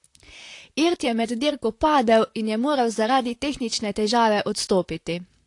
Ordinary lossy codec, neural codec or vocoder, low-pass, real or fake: AAC, 48 kbps; vocoder, 44.1 kHz, 128 mel bands, Pupu-Vocoder; 10.8 kHz; fake